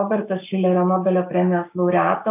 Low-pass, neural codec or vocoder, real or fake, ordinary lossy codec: 3.6 kHz; codec, 16 kHz, 6 kbps, DAC; fake; AAC, 32 kbps